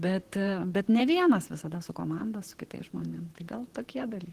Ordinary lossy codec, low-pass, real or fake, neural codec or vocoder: Opus, 16 kbps; 14.4 kHz; fake; vocoder, 44.1 kHz, 128 mel bands, Pupu-Vocoder